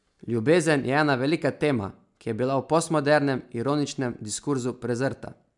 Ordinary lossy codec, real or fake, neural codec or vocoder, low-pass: MP3, 96 kbps; real; none; 10.8 kHz